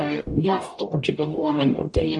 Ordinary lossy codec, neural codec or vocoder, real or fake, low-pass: AAC, 48 kbps; codec, 44.1 kHz, 0.9 kbps, DAC; fake; 10.8 kHz